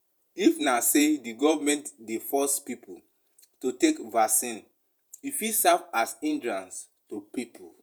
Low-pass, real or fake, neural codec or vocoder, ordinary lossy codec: none; fake; vocoder, 48 kHz, 128 mel bands, Vocos; none